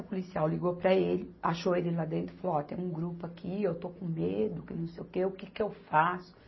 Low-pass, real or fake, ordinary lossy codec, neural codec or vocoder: 7.2 kHz; real; MP3, 24 kbps; none